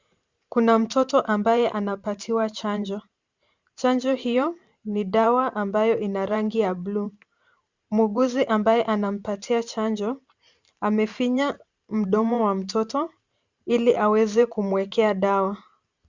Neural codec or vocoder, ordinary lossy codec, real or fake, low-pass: vocoder, 44.1 kHz, 128 mel bands every 512 samples, BigVGAN v2; Opus, 64 kbps; fake; 7.2 kHz